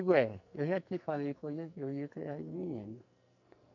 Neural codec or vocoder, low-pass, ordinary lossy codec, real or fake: codec, 44.1 kHz, 2.6 kbps, SNAC; 7.2 kHz; none; fake